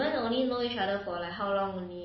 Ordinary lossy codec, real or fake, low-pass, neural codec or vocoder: MP3, 24 kbps; real; 7.2 kHz; none